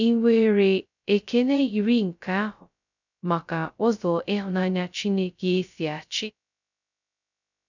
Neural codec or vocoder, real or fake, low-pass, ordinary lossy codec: codec, 16 kHz, 0.2 kbps, FocalCodec; fake; 7.2 kHz; none